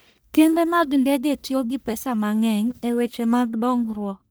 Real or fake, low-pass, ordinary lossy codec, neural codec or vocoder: fake; none; none; codec, 44.1 kHz, 1.7 kbps, Pupu-Codec